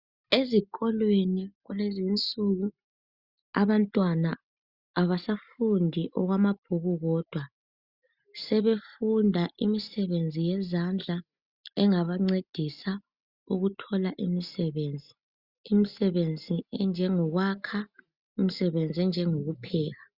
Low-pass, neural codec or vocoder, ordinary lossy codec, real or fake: 5.4 kHz; none; Opus, 64 kbps; real